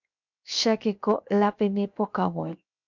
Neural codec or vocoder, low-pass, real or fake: codec, 16 kHz, 0.7 kbps, FocalCodec; 7.2 kHz; fake